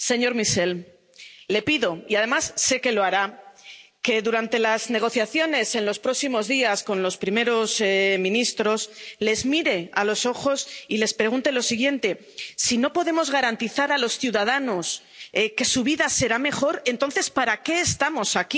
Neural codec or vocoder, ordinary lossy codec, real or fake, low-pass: none; none; real; none